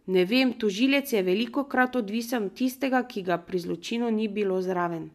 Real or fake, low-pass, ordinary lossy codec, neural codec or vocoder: real; 14.4 kHz; MP3, 96 kbps; none